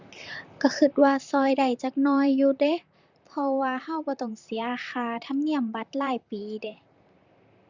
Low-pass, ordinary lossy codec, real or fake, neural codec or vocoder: 7.2 kHz; Opus, 64 kbps; fake; vocoder, 44.1 kHz, 128 mel bands, Pupu-Vocoder